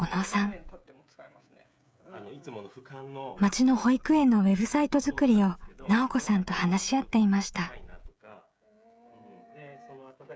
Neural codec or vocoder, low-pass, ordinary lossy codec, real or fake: codec, 16 kHz, 16 kbps, FreqCodec, smaller model; none; none; fake